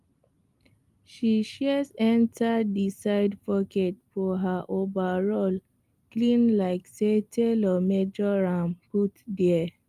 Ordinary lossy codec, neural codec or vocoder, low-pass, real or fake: Opus, 32 kbps; none; 14.4 kHz; real